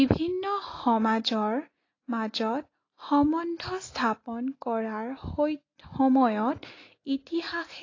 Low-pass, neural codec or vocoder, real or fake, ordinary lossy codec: 7.2 kHz; none; real; AAC, 32 kbps